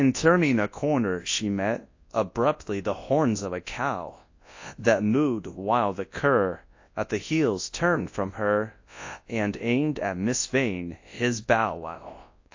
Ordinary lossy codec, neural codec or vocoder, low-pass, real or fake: AAC, 48 kbps; codec, 24 kHz, 0.9 kbps, WavTokenizer, large speech release; 7.2 kHz; fake